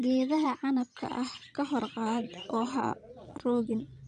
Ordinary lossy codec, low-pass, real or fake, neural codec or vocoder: none; 9.9 kHz; fake; vocoder, 22.05 kHz, 80 mel bands, Vocos